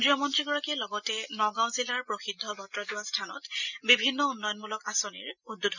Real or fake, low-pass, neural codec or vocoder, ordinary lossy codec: real; 7.2 kHz; none; none